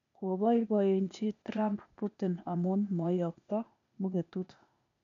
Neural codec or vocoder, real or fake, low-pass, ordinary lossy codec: codec, 16 kHz, 0.8 kbps, ZipCodec; fake; 7.2 kHz; AAC, 64 kbps